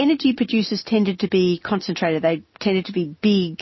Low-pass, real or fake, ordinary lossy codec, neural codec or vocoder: 7.2 kHz; real; MP3, 24 kbps; none